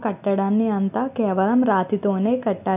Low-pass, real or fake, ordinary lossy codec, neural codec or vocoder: 3.6 kHz; real; none; none